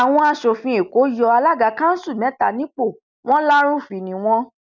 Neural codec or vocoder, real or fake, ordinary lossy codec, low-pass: none; real; none; 7.2 kHz